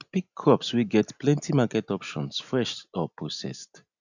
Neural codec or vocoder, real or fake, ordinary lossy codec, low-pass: none; real; none; 7.2 kHz